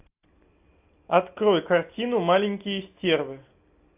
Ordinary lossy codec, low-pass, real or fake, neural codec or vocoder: AAC, 32 kbps; 3.6 kHz; real; none